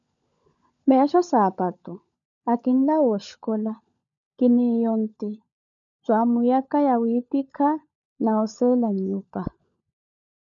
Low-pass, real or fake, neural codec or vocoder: 7.2 kHz; fake; codec, 16 kHz, 16 kbps, FunCodec, trained on LibriTTS, 50 frames a second